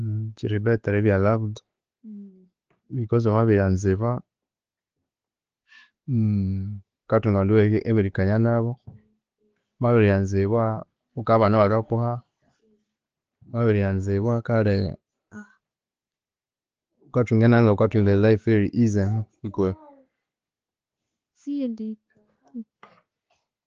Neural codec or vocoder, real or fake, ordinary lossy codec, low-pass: none; real; Opus, 16 kbps; 7.2 kHz